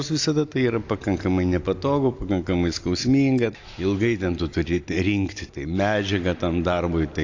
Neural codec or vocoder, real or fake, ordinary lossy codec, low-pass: none; real; AAC, 48 kbps; 7.2 kHz